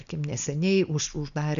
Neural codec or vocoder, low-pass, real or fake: none; 7.2 kHz; real